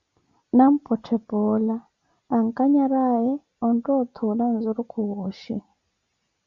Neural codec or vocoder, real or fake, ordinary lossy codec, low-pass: none; real; Opus, 64 kbps; 7.2 kHz